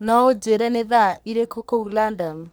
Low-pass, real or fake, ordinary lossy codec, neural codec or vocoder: none; fake; none; codec, 44.1 kHz, 3.4 kbps, Pupu-Codec